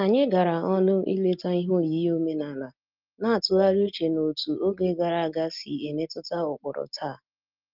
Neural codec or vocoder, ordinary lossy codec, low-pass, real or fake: none; Opus, 32 kbps; 5.4 kHz; real